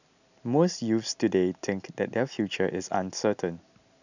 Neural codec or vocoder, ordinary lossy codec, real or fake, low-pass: none; none; real; 7.2 kHz